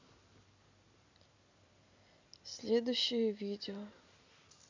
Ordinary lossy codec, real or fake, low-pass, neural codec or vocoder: MP3, 64 kbps; real; 7.2 kHz; none